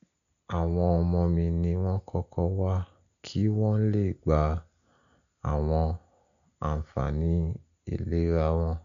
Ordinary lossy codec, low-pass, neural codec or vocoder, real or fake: none; 7.2 kHz; none; real